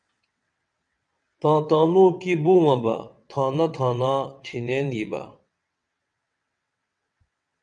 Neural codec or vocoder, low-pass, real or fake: vocoder, 22.05 kHz, 80 mel bands, WaveNeXt; 9.9 kHz; fake